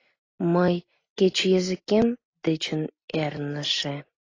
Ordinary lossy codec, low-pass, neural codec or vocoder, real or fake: AAC, 32 kbps; 7.2 kHz; none; real